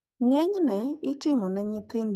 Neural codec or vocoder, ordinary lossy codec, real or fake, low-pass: codec, 44.1 kHz, 2.6 kbps, SNAC; none; fake; 14.4 kHz